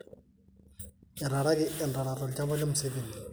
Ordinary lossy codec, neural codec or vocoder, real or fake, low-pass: none; none; real; none